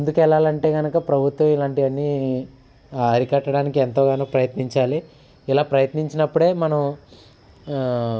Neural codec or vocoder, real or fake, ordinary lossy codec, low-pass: none; real; none; none